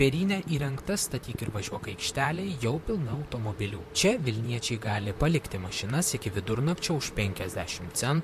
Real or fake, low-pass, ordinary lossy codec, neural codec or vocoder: fake; 14.4 kHz; MP3, 64 kbps; vocoder, 44.1 kHz, 128 mel bands, Pupu-Vocoder